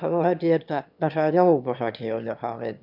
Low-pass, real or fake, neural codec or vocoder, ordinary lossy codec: 5.4 kHz; fake; autoencoder, 22.05 kHz, a latent of 192 numbers a frame, VITS, trained on one speaker; none